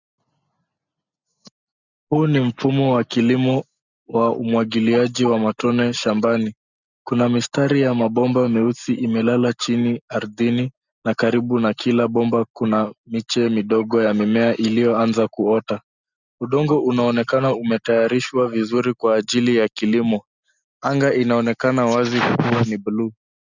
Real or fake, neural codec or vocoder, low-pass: real; none; 7.2 kHz